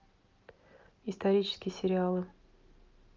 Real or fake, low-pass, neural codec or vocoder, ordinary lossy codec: real; 7.2 kHz; none; Opus, 24 kbps